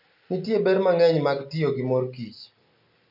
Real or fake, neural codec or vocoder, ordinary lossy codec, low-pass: real; none; none; 5.4 kHz